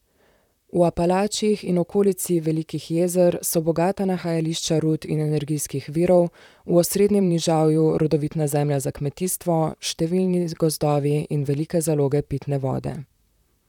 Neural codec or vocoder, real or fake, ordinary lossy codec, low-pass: vocoder, 44.1 kHz, 128 mel bands, Pupu-Vocoder; fake; none; 19.8 kHz